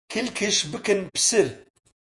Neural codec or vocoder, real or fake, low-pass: vocoder, 48 kHz, 128 mel bands, Vocos; fake; 10.8 kHz